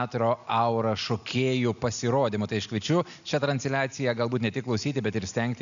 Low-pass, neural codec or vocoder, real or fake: 7.2 kHz; none; real